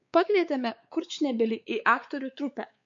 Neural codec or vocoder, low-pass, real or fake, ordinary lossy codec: codec, 16 kHz, 4 kbps, X-Codec, WavLM features, trained on Multilingual LibriSpeech; 7.2 kHz; fake; MP3, 48 kbps